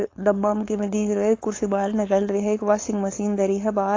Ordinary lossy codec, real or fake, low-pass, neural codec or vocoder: AAC, 32 kbps; fake; 7.2 kHz; codec, 16 kHz, 4.8 kbps, FACodec